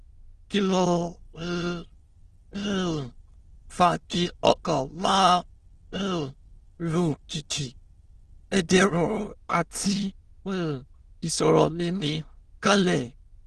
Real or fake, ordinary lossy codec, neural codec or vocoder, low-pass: fake; Opus, 16 kbps; autoencoder, 22.05 kHz, a latent of 192 numbers a frame, VITS, trained on many speakers; 9.9 kHz